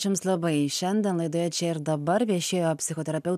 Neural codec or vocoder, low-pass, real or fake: none; 14.4 kHz; real